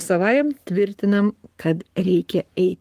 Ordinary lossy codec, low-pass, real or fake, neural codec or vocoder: Opus, 24 kbps; 14.4 kHz; fake; codec, 44.1 kHz, 7.8 kbps, Pupu-Codec